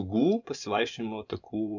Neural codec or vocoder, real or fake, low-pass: vocoder, 24 kHz, 100 mel bands, Vocos; fake; 7.2 kHz